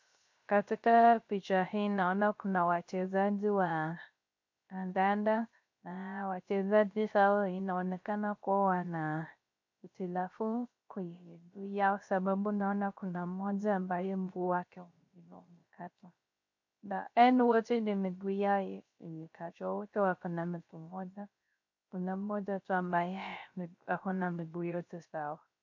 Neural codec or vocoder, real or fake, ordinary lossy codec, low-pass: codec, 16 kHz, 0.3 kbps, FocalCodec; fake; MP3, 48 kbps; 7.2 kHz